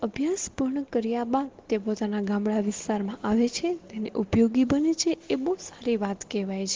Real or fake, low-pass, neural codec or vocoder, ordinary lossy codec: real; 7.2 kHz; none; Opus, 16 kbps